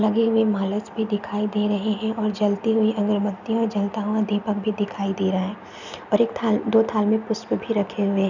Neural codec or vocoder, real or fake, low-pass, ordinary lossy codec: none; real; 7.2 kHz; none